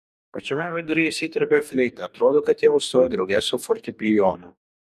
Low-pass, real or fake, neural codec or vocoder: 14.4 kHz; fake; codec, 44.1 kHz, 2.6 kbps, DAC